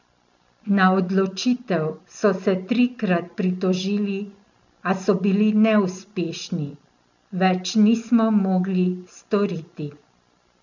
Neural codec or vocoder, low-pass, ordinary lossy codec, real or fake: none; 7.2 kHz; none; real